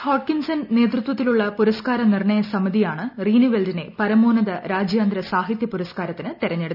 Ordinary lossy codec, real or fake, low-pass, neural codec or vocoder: none; real; 5.4 kHz; none